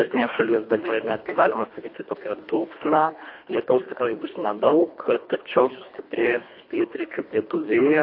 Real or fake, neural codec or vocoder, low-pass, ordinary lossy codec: fake; codec, 24 kHz, 1.5 kbps, HILCodec; 5.4 kHz; MP3, 32 kbps